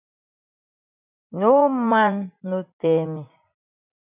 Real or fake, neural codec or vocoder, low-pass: fake; vocoder, 44.1 kHz, 80 mel bands, Vocos; 3.6 kHz